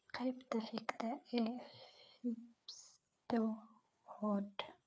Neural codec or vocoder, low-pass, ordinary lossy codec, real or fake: codec, 16 kHz, 4 kbps, FreqCodec, larger model; none; none; fake